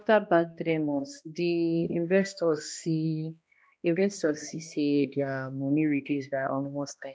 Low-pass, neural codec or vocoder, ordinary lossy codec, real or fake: none; codec, 16 kHz, 1 kbps, X-Codec, HuBERT features, trained on balanced general audio; none; fake